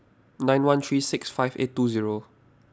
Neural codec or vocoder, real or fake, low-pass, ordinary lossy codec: none; real; none; none